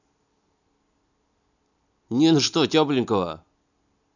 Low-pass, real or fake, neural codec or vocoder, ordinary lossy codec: 7.2 kHz; real; none; none